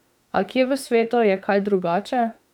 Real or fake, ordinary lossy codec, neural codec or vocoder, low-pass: fake; none; autoencoder, 48 kHz, 32 numbers a frame, DAC-VAE, trained on Japanese speech; 19.8 kHz